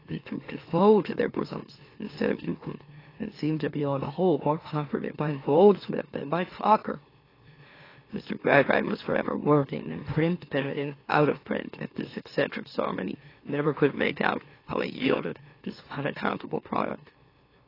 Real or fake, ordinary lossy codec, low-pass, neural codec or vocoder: fake; AAC, 24 kbps; 5.4 kHz; autoencoder, 44.1 kHz, a latent of 192 numbers a frame, MeloTTS